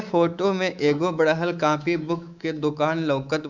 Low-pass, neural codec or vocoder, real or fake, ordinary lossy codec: 7.2 kHz; codec, 24 kHz, 3.1 kbps, DualCodec; fake; none